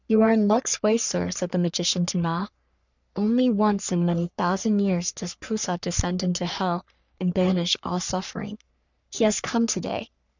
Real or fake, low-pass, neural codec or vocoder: fake; 7.2 kHz; codec, 44.1 kHz, 3.4 kbps, Pupu-Codec